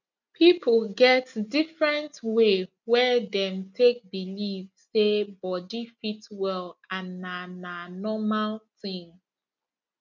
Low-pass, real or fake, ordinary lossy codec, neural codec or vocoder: 7.2 kHz; real; none; none